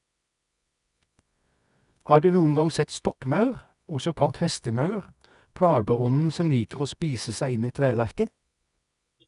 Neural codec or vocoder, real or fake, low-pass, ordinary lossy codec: codec, 24 kHz, 0.9 kbps, WavTokenizer, medium music audio release; fake; 10.8 kHz; none